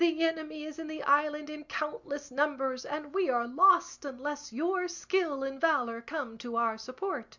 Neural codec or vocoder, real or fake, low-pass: none; real; 7.2 kHz